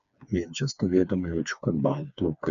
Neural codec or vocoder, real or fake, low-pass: codec, 16 kHz, 4 kbps, FreqCodec, smaller model; fake; 7.2 kHz